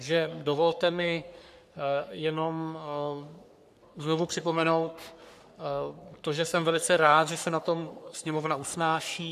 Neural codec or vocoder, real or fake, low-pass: codec, 44.1 kHz, 3.4 kbps, Pupu-Codec; fake; 14.4 kHz